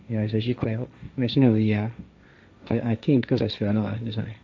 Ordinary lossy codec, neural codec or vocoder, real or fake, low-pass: none; codec, 16 kHz, 1.1 kbps, Voila-Tokenizer; fake; none